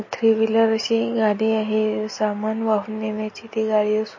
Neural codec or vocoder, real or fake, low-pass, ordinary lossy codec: none; real; 7.2 kHz; MP3, 32 kbps